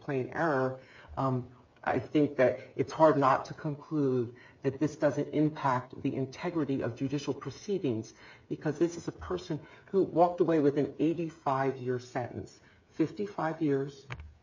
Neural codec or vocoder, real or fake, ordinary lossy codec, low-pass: codec, 16 kHz, 8 kbps, FreqCodec, smaller model; fake; MP3, 48 kbps; 7.2 kHz